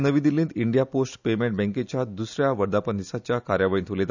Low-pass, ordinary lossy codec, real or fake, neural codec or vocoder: 7.2 kHz; none; real; none